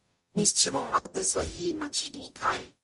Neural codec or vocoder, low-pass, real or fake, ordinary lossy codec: codec, 44.1 kHz, 0.9 kbps, DAC; 14.4 kHz; fake; MP3, 48 kbps